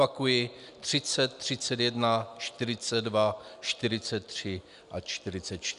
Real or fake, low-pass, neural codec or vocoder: real; 10.8 kHz; none